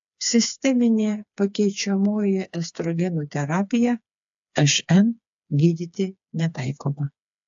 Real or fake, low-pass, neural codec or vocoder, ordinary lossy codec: fake; 7.2 kHz; codec, 16 kHz, 4 kbps, FreqCodec, smaller model; AAC, 64 kbps